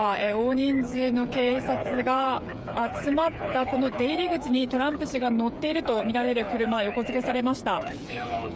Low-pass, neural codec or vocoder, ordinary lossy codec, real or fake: none; codec, 16 kHz, 8 kbps, FreqCodec, smaller model; none; fake